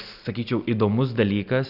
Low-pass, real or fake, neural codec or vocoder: 5.4 kHz; real; none